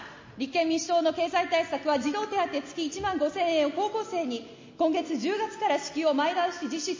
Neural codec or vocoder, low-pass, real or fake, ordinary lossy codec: none; 7.2 kHz; real; MP3, 32 kbps